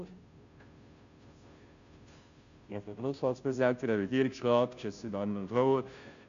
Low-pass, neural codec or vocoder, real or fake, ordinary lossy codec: 7.2 kHz; codec, 16 kHz, 0.5 kbps, FunCodec, trained on Chinese and English, 25 frames a second; fake; AAC, 96 kbps